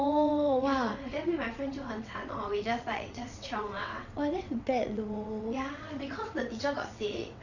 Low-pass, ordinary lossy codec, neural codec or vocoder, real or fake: 7.2 kHz; none; vocoder, 22.05 kHz, 80 mel bands, WaveNeXt; fake